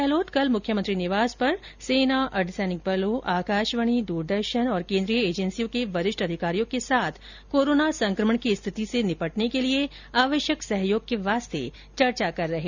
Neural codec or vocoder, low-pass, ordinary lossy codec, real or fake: none; 7.2 kHz; none; real